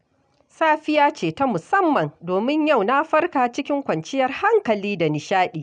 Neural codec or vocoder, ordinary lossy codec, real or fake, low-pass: none; none; real; 9.9 kHz